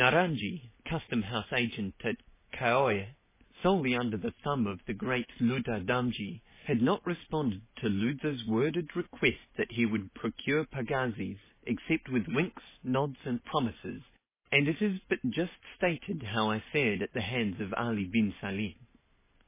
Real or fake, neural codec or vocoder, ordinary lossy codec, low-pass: real; none; MP3, 16 kbps; 3.6 kHz